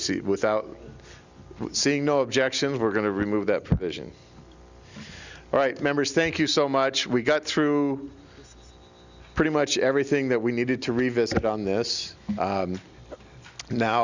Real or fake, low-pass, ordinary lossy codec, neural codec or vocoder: real; 7.2 kHz; Opus, 64 kbps; none